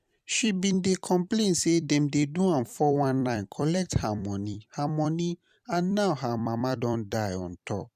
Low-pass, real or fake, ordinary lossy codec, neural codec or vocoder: 14.4 kHz; fake; none; vocoder, 44.1 kHz, 128 mel bands every 256 samples, BigVGAN v2